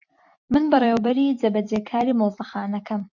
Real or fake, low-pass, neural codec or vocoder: fake; 7.2 kHz; vocoder, 44.1 kHz, 128 mel bands every 256 samples, BigVGAN v2